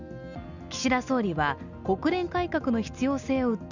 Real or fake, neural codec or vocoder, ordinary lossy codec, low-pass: real; none; none; 7.2 kHz